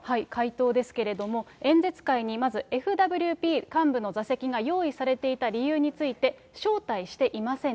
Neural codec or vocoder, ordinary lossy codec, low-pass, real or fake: none; none; none; real